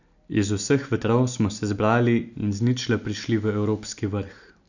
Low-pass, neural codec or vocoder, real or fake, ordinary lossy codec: 7.2 kHz; none; real; none